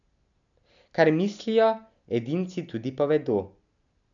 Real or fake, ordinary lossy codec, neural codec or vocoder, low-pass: real; none; none; 7.2 kHz